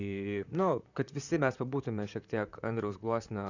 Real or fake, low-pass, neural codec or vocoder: fake; 7.2 kHz; vocoder, 44.1 kHz, 128 mel bands, Pupu-Vocoder